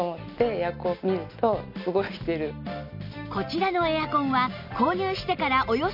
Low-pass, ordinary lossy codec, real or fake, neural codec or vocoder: 5.4 kHz; none; real; none